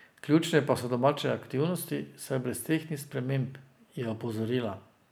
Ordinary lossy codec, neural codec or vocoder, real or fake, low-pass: none; none; real; none